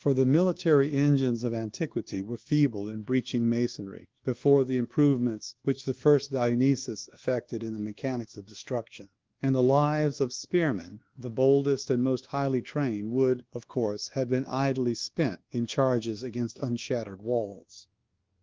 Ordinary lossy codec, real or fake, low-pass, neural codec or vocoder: Opus, 16 kbps; fake; 7.2 kHz; codec, 24 kHz, 1.2 kbps, DualCodec